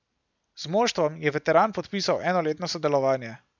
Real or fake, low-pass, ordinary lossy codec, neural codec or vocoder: real; 7.2 kHz; none; none